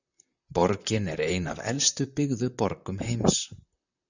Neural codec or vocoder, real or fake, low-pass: vocoder, 44.1 kHz, 128 mel bands, Pupu-Vocoder; fake; 7.2 kHz